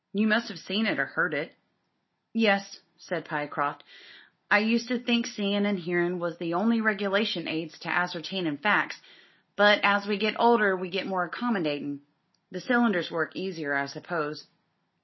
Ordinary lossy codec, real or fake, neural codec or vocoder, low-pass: MP3, 24 kbps; real; none; 7.2 kHz